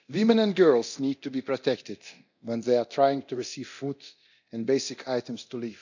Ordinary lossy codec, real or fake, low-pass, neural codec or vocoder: none; fake; 7.2 kHz; codec, 24 kHz, 0.9 kbps, DualCodec